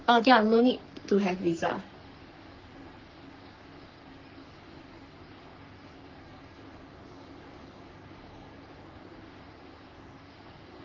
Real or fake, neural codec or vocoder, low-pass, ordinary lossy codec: fake; codec, 44.1 kHz, 3.4 kbps, Pupu-Codec; 7.2 kHz; Opus, 32 kbps